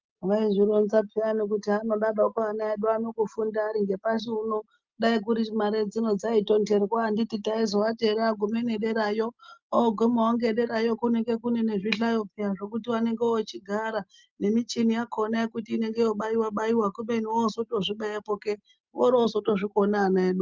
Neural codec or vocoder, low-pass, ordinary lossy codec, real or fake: none; 7.2 kHz; Opus, 24 kbps; real